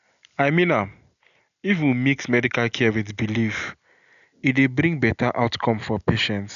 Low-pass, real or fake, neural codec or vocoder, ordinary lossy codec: 7.2 kHz; real; none; none